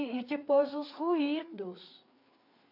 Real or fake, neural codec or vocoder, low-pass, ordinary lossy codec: fake; codec, 16 kHz, 4 kbps, FreqCodec, larger model; 5.4 kHz; AAC, 48 kbps